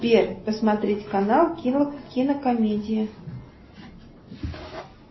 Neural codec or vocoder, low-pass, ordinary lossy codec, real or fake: none; 7.2 kHz; MP3, 24 kbps; real